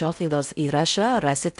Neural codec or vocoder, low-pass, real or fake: codec, 16 kHz in and 24 kHz out, 0.6 kbps, FocalCodec, streaming, 4096 codes; 10.8 kHz; fake